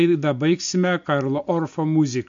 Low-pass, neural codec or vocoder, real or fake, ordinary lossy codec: 7.2 kHz; none; real; MP3, 48 kbps